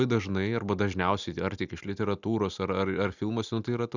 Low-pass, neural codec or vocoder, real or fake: 7.2 kHz; none; real